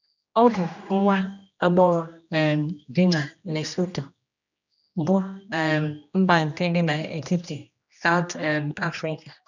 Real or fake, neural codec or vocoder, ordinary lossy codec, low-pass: fake; codec, 16 kHz, 1 kbps, X-Codec, HuBERT features, trained on general audio; none; 7.2 kHz